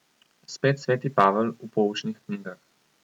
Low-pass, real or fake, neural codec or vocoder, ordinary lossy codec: 19.8 kHz; real; none; none